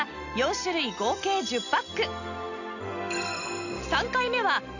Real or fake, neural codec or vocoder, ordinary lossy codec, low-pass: real; none; none; 7.2 kHz